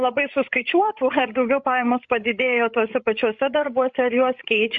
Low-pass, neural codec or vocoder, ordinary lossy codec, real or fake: 7.2 kHz; codec, 16 kHz, 16 kbps, FreqCodec, larger model; MP3, 48 kbps; fake